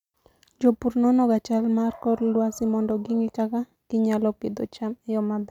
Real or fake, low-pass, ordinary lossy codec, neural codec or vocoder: real; 19.8 kHz; none; none